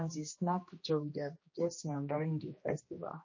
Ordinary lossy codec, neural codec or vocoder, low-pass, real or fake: MP3, 32 kbps; codec, 16 kHz, 1 kbps, X-Codec, HuBERT features, trained on general audio; 7.2 kHz; fake